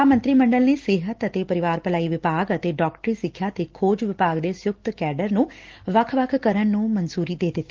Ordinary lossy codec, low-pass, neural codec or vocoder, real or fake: Opus, 16 kbps; 7.2 kHz; none; real